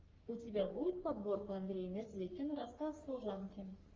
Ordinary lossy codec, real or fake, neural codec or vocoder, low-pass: Opus, 32 kbps; fake; codec, 44.1 kHz, 3.4 kbps, Pupu-Codec; 7.2 kHz